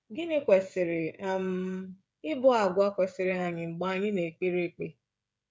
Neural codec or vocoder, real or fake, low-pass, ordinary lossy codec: codec, 16 kHz, 16 kbps, FreqCodec, smaller model; fake; none; none